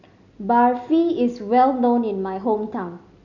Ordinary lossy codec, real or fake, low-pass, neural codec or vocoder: none; real; 7.2 kHz; none